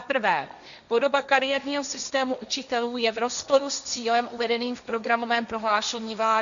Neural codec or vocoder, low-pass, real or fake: codec, 16 kHz, 1.1 kbps, Voila-Tokenizer; 7.2 kHz; fake